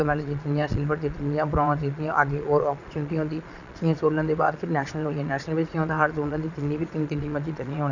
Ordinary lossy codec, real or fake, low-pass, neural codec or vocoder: none; fake; 7.2 kHz; vocoder, 22.05 kHz, 80 mel bands, Vocos